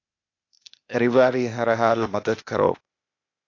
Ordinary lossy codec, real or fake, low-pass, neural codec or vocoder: AAC, 48 kbps; fake; 7.2 kHz; codec, 16 kHz, 0.8 kbps, ZipCodec